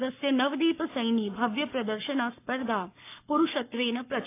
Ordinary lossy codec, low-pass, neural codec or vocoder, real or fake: AAC, 24 kbps; 3.6 kHz; codec, 44.1 kHz, 7.8 kbps, Pupu-Codec; fake